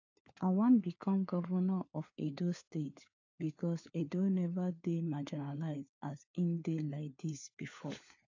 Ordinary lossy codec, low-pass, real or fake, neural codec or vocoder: AAC, 48 kbps; 7.2 kHz; fake; codec, 16 kHz, 4 kbps, FunCodec, trained on LibriTTS, 50 frames a second